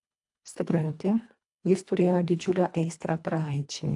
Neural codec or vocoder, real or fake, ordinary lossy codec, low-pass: codec, 24 kHz, 1.5 kbps, HILCodec; fake; AAC, 64 kbps; 10.8 kHz